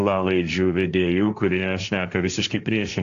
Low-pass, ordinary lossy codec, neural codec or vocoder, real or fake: 7.2 kHz; AAC, 64 kbps; codec, 16 kHz, 1.1 kbps, Voila-Tokenizer; fake